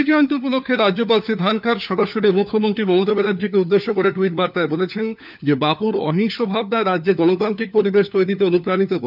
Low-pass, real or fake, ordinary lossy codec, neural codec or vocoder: 5.4 kHz; fake; none; codec, 16 kHz, 4 kbps, FunCodec, trained on LibriTTS, 50 frames a second